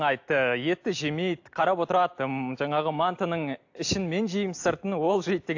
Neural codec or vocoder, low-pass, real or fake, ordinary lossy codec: none; 7.2 kHz; real; AAC, 48 kbps